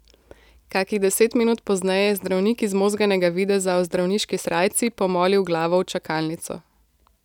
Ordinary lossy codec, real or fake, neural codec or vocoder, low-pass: none; real; none; 19.8 kHz